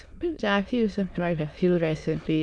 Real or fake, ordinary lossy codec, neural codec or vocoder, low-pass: fake; none; autoencoder, 22.05 kHz, a latent of 192 numbers a frame, VITS, trained on many speakers; none